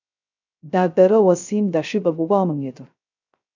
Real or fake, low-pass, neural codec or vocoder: fake; 7.2 kHz; codec, 16 kHz, 0.3 kbps, FocalCodec